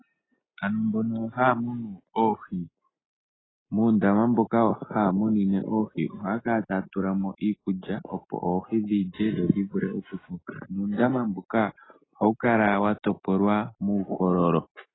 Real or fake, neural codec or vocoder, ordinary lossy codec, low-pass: real; none; AAC, 16 kbps; 7.2 kHz